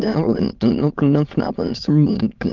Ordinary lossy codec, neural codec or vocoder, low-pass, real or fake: Opus, 32 kbps; autoencoder, 22.05 kHz, a latent of 192 numbers a frame, VITS, trained on many speakers; 7.2 kHz; fake